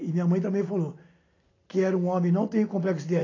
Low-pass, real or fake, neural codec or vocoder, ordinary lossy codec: 7.2 kHz; real; none; AAC, 32 kbps